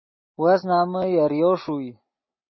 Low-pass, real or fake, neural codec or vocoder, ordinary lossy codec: 7.2 kHz; real; none; MP3, 24 kbps